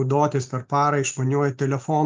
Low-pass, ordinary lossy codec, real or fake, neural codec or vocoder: 7.2 kHz; Opus, 24 kbps; real; none